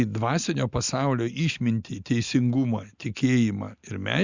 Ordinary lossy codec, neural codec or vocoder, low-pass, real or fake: Opus, 64 kbps; none; 7.2 kHz; real